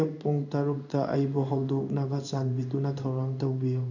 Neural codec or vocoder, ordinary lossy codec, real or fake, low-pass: codec, 16 kHz in and 24 kHz out, 1 kbps, XY-Tokenizer; none; fake; 7.2 kHz